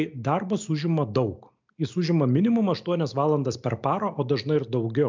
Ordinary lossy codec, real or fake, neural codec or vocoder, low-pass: MP3, 64 kbps; real; none; 7.2 kHz